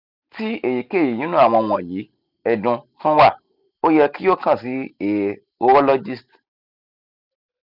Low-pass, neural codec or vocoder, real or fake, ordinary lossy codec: 5.4 kHz; none; real; none